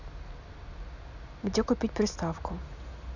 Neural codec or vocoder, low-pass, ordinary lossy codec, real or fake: none; 7.2 kHz; none; real